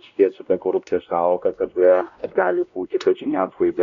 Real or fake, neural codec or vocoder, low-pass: fake; codec, 16 kHz, 1 kbps, X-Codec, WavLM features, trained on Multilingual LibriSpeech; 7.2 kHz